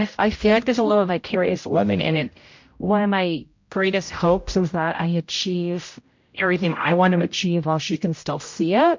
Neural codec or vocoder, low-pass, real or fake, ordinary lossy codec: codec, 16 kHz, 0.5 kbps, X-Codec, HuBERT features, trained on general audio; 7.2 kHz; fake; MP3, 48 kbps